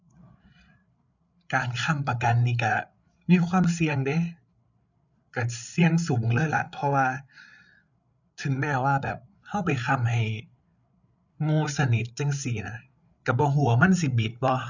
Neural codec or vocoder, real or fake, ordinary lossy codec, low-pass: codec, 16 kHz, 8 kbps, FreqCodec, larger model; fake; none; 7.2 kHz